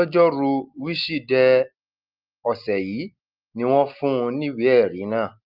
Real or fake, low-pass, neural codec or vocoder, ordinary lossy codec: real; 5.4 kHz; none; Opus, 24 kbps